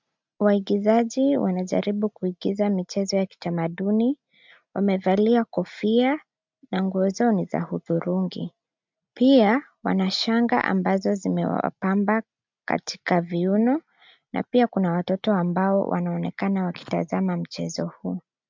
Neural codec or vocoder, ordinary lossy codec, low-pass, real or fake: none; MP3, 64 kbps; 7.2 kHz; real